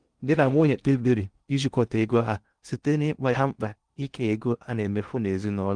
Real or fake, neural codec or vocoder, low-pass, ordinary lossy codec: fake; codec, 16 kHz in and 24 kHz out, 0.6 kbps, FocalCodec, streaming, 2048 codes; 9.9 kHz; Opus, 24 kbps